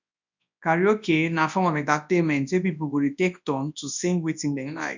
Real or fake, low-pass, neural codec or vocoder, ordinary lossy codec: fake; 7.2 kHz; codec, 24 kHz, 0.9 kbps, WavTokenizer, large speech release; none